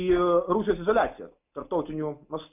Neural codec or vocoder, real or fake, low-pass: none; real; 3.6 kHz